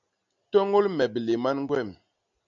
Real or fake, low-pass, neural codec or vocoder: real; 7.2 kHz; none